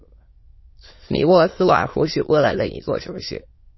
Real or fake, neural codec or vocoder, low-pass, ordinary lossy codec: fake; autoencoder, 22.05 kHz, a latent of 192 numbers a frame, VITS, trained on many speakers; 7.2 kHz; MP3, 24 kbps